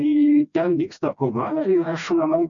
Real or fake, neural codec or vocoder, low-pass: fake; codec, 16 kHz, 1 kbps, FreqCodec, smaller model; 7.2 kHz